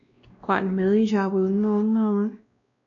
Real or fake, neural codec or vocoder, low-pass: fake; codec, 16 kHz, 1 kbps, X-Codec, WavLM features, trained on Multilingual LibriSpeech; 7.2 kHz